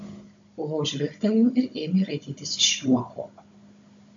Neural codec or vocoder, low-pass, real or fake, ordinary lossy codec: codec, 16 kHz, 16 kbps, FunCodec, trained on Chinese and English, 50 frames a second; 7.2 kHz; fake; AAC, 64 kbps